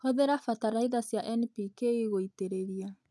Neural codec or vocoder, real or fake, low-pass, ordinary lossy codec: none; real; none; none